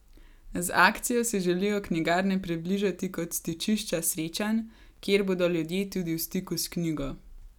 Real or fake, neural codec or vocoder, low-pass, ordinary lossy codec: real; none; 19.8 kHz; none